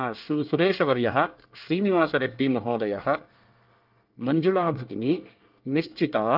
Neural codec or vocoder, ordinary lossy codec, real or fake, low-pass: codec, 24 kHz, 1 kbps, SNAC; Opus, 24 kbps; fake; 5.4 kHz